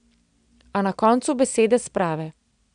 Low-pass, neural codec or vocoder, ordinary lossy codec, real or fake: 9.9 kHz; none; none; real